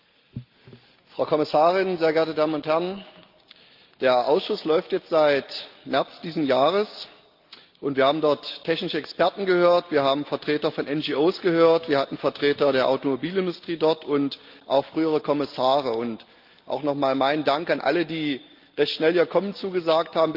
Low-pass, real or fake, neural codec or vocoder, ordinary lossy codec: 5.4 kHz; real; none; Opus, 32 kbps